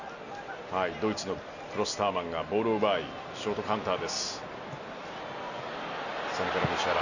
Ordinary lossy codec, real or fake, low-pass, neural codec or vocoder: none; real; 7.2 kHz; none